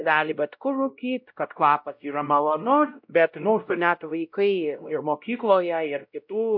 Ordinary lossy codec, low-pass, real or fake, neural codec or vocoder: MP3, 48 kbps; 5.4 kHz; fake; codec, 16 kHz, 0.5 kbps, X-Codec, WavLM features, trained on Multilingual LibriSpeech